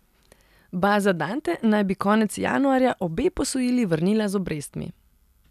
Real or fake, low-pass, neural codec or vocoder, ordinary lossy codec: real; 14.4 kHz; none; none